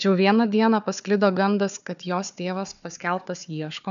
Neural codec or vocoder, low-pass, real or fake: codec, 16 kHz, 4 kbps, FunCodec, trained on Chinese and English, 50 frames a second; 7.2 kHz; fake